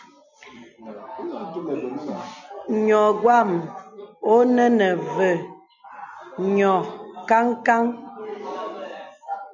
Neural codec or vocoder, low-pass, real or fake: none; 7.2 kHz; real